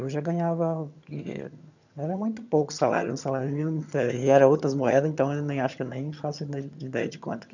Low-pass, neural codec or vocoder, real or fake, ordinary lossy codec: 7.2 kHz; vocoder, 22.05 kHz, 80 mel bands, HiFi-GAN; fake; none